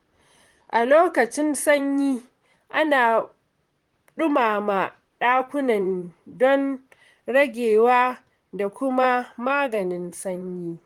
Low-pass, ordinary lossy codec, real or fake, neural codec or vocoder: 19.8 kHz; Opus, 24 kbps; fake; vocoder, 44.1 kHz, 128 mel bands, Pupu-Vocoder